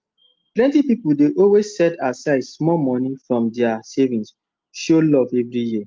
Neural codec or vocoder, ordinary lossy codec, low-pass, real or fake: none; Opus, 32 kbps; 7.2 kHz; real